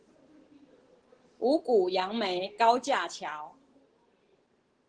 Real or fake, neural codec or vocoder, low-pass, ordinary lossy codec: real; none; 9.9 kHz; Opus, 16 kbps